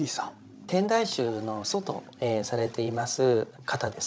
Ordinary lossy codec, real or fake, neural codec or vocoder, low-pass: none; fake; codec, 16 kHz, 16 kbps, FreqCodec, larger model; none